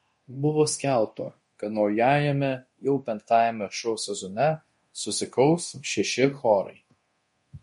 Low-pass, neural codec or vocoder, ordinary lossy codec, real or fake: 10.8 kHz; codec, 24 kHz, 0.9 kbps, DualCodec; MP3, 48 kbps; fake